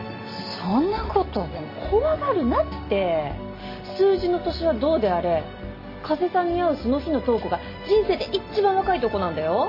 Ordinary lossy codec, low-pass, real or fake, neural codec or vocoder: MP3, 24 kbps; 5.4 kHz; real; none